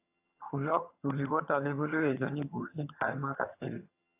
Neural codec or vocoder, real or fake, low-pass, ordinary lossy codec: vocoder, 22.05 kHz, 80 mel bands, HiFi-GAN; fake; 3.6 kHz; AAC, 32 kbps